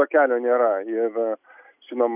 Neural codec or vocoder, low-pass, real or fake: none; 3.6 kHz; real